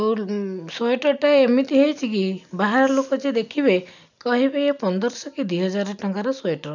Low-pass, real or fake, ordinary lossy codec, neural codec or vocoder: 7.2 kHz; real; none; none